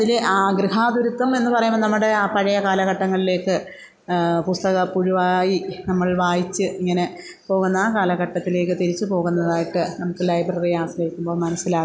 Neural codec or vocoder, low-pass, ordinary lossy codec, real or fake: none; none; none; real